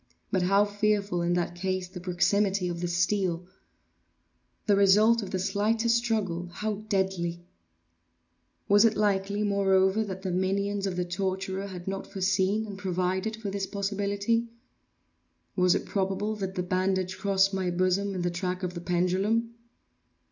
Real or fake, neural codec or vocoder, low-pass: real; none; 7.2 kHz